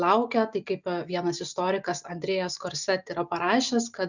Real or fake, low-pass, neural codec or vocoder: real; 7.2 kHz; none